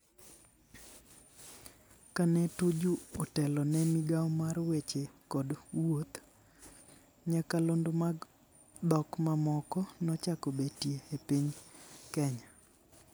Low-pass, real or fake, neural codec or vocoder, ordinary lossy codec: none; real; none; none